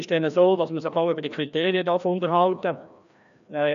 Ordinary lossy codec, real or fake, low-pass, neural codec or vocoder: none; fake; 7.2 kHz; codec, 16 kHz, 1 kbps, FreqCodec, larger model